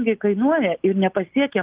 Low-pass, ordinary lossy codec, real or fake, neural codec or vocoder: 3.6 kHz; Opus, 32 kbps; real; none